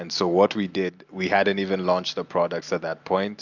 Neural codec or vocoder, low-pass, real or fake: none; 7.2 kHz; real